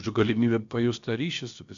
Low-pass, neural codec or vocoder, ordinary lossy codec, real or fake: 7.2 kHz; codec, 16 kHz, about 1 kbps, DyCAST, with the encoder's durations; AAC, 64 kbps; fake